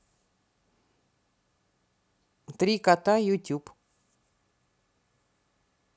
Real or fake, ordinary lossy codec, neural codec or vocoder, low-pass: real; none; none; none